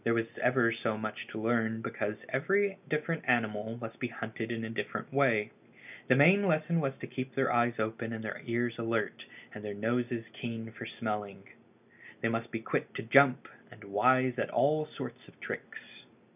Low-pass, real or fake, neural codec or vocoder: 3.6 kHz; real; none